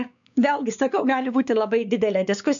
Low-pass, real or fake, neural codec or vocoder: 7.2 kHz; fake; codec, 16 kHz, 4 kbps, X-Codec, WavLM features, trained on Multilingual LibriSpeech